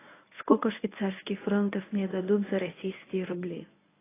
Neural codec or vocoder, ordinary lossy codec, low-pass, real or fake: codec, 24 kHz, 0.9 kbps, WavTokenizer, medium speech release version 1; AAC, 16 kbps; 3.6 kHz; fake